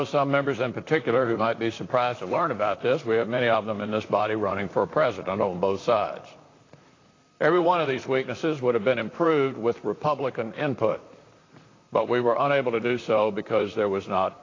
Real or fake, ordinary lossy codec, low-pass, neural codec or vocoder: fake; AAC, 32 kbps; 7.2 kHz; vocoder, 44.1 kHz, 128 mel bands, Pupu-Vocoder